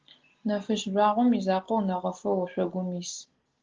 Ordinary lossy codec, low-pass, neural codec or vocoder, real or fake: Opus, 16 kbps; 7.2 kHz; none; real